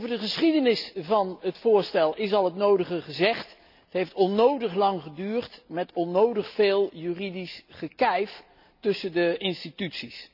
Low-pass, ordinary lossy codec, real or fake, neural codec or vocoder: 5.4 kHz; none; real; none